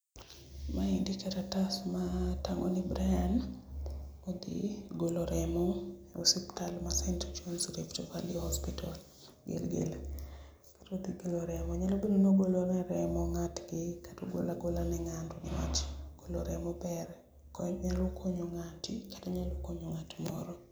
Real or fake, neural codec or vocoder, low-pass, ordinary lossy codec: real; none; none; none